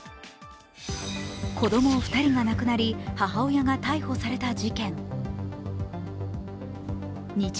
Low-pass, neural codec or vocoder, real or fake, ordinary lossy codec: none; none; real; none